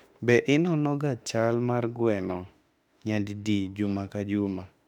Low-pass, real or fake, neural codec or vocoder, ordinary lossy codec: 19.8 kHz; fake; autoencoder, 48 kHz, 32 numbers a frame, DAC-VAE, trained on Japanese speech; none